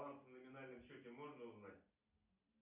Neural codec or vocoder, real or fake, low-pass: none; real; 3.6 kHz